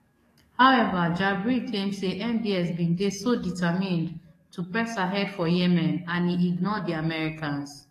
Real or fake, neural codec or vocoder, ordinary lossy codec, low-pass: fake; codec, 44.1 kHz, 7.8 kbps, DAC; AAC, 48 kbps; 14.4 kHz